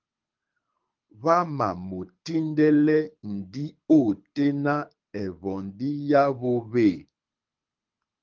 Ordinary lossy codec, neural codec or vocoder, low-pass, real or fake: Opus, 24 kbps; codec, 24 kHz, 6 kbps, HILCodec; 7.2 kHz; fake